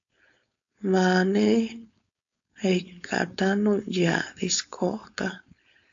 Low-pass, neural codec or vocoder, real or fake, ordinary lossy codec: 7.2 kHz; codec, 16 kHz, 4.8 kbps, FACodec; fake; AAC, 64 kbps